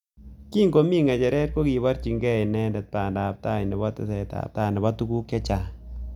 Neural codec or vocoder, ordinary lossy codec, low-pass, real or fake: none; none; 19.8 kHz; real